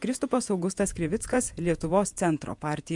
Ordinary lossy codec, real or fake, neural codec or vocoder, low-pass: MP3, 64 kbps; fake; vocoder, 48 kHz, 128 mel bands, Vocos; 10.8 kHz